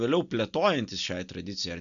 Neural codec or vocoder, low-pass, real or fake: none; 7.2 kHz; real